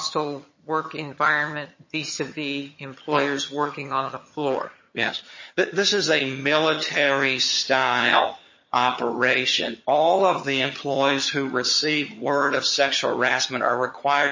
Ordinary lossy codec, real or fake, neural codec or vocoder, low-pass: MP3, 32 kbps; fake; vocoder, 22.05 kHz, 80 mel bands, HiFi-GAN; 7.2 kHz